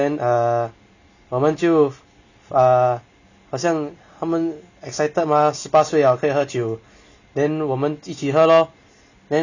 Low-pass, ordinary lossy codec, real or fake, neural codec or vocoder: 7.2 kHz; none; real; none